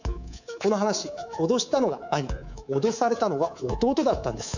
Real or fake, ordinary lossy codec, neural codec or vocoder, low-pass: fake; none; codec, 24 kHz, 3.1 kbps, DualCodec; 7.2 kHz